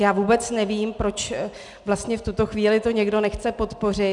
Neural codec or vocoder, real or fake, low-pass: none; real; 10.8 kHz